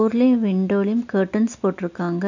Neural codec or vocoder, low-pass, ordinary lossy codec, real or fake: none; 7.2 kHz; none; real